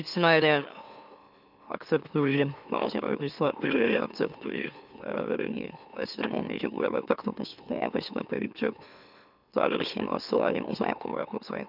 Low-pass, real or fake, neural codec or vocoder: 5.4 kHz; fake; autoencoder, 44.1 kHz, a latent of 192 numbers a frame, MeloTTS